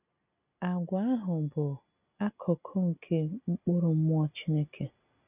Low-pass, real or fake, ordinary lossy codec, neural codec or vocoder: 3.6 kHz; real; none; none